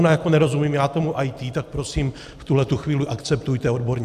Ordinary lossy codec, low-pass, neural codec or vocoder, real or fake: AAC, 96 kbps; 14.4 kHz; none; real